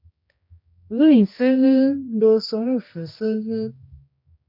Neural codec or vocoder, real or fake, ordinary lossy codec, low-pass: codec, 16 kHz, 1 kbps, X-Codec, HuBERT features, trained on general audio; fake; MP3, 48 kbps; 5.4 kHz